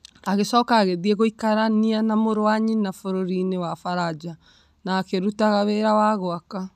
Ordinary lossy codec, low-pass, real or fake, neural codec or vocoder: none; 14.4 kHz; real; none